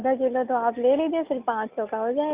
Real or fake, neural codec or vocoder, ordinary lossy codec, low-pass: real; none; Opus, 64 kbps; 3.6 kHz